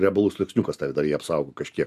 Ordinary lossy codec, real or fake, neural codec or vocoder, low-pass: MP3, 96 kbps; real; none; 14.4 kHz